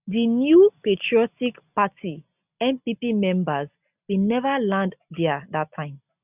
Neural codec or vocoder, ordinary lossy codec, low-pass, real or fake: none; none; 3.6 kHz; real